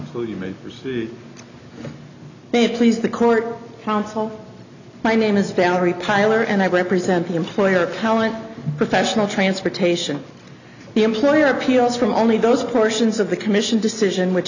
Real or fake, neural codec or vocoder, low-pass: real; none; 7.2 kHz